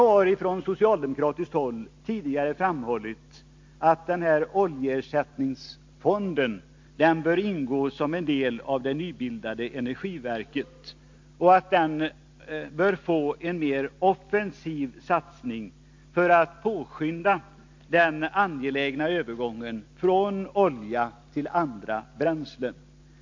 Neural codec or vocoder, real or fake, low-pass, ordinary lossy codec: none; real; 7.2 kHz; MP3, 48 kbps